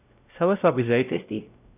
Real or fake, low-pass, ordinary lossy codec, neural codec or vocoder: fake; 3.6 kHz; none; codec, 16 kHz, 0.5 kbps, X-Codec, WavLM features, trained on Multilingual LibriSpeech